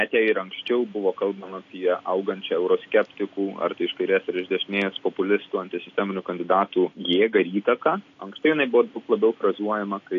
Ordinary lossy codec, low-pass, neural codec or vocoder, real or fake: AAC, 48 kbps; 7.2 kHz; none; real